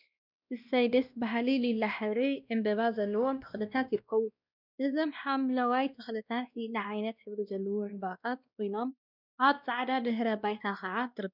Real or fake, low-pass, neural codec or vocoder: fake; 5.4 kHz; codec, 16 kHz, 1 kbps, X-Codec, WavLM features, trained on Multilingual LibriSpeech